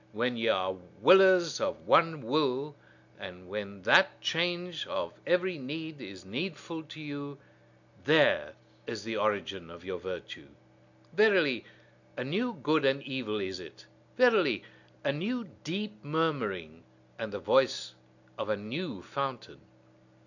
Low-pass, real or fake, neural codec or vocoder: 7.2 kHz; real; none